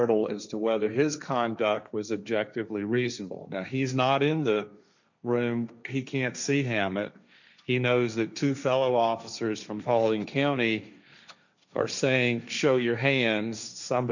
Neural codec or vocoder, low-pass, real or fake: codec, 16 kHz, 1.1 kbps, Voila-Tokenizer; 7.2 kHz; fake